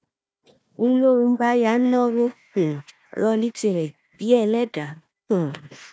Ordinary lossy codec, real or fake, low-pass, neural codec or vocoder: none; fake; none; codec, 16 kHz, 1 kbps, FunCodec, trained on Chinese and English, 50 frames a second